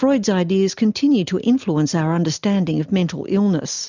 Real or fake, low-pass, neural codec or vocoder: real; 7.2 kHz; none